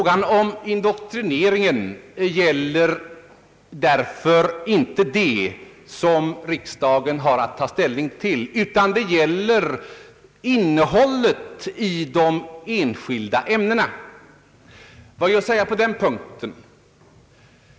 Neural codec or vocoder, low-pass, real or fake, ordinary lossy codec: none; none; real; none